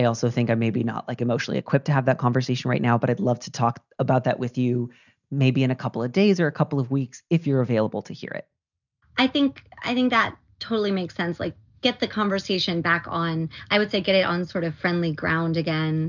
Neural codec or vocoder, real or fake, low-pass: none; real; 7.2 kHz